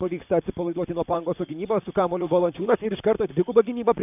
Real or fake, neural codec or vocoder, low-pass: fake; vocoder, 44.1 kHz, 128 mel bands, Pupu-Vocoder; 3.6 kHz